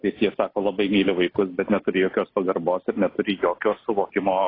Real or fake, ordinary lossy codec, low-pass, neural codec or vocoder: real; AAC, 24 kbps; 5.4 kHz; none